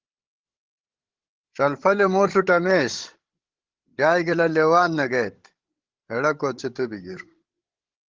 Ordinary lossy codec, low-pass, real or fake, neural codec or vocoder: Opus, 16 kbps; 7.2 kHz; fake; codec, 16 kHz, 16 kbps, FreqCodec, larger model